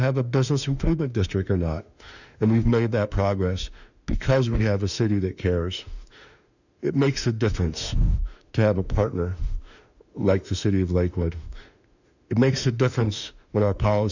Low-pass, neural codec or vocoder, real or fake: 7.2 kHz; autoencoder, 48 kHz, 32 numbers a frame, DAC-VAE, trained on Japanese speech; fake